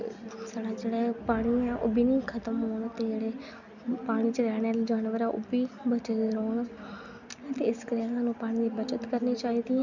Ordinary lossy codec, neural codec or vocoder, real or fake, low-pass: none; none; real; 7.2 kHz